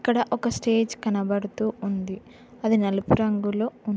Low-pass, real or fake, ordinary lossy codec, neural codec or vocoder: none; real; none; none